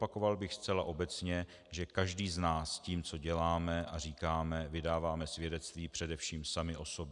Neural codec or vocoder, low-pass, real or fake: none; 10.8 kHz; real